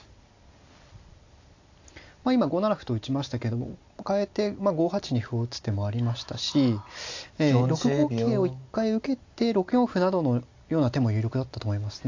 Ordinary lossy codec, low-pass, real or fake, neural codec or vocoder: AAC, 48 kbps; 7.2 kHz; real; none